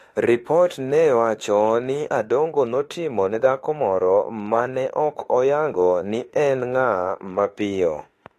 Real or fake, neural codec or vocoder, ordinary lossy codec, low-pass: fake; autoencoder, 48 kHz, 32 numbers a frame, DAC-VAE, trained on Japanese speech; AAC, 48 kbps; 14.4 kHz